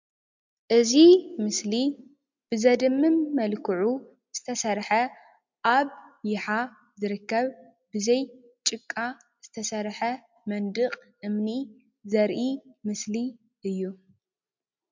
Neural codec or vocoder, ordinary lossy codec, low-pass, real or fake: none; MP3, 64 kbps; 7.2 kHz; real